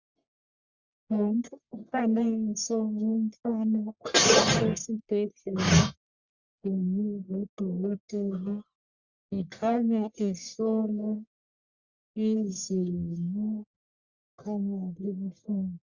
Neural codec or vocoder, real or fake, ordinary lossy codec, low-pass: codec, 44.1 kHz, 1.7 kbps, Pupu-Codec; fake; Opus, 64 kbps; 7.2 kHz